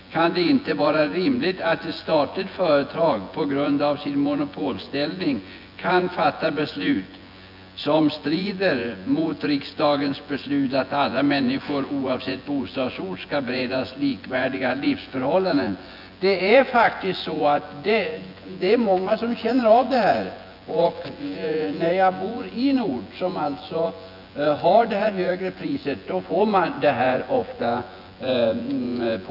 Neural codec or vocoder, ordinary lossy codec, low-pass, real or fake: vocoder, 24 kHz, 100 mel bands, Vocos; none; 5.4 kHz; fake